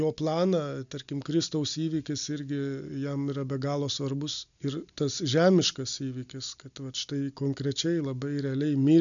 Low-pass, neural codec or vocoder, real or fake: 7.2 kHz; none; real